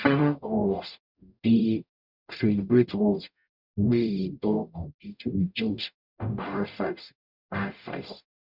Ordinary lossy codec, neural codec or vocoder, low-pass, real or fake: none; codec, 44.1 kHz, 0.9 kbps, DAC; 5.4 kHz; fake